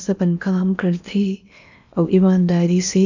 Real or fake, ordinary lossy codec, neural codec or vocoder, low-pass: fake; none; codec, 16 kHz in and 24 kHz out, 0.8 kbps, FocalCodec, streaming, 65536 codes; 7.2 kHz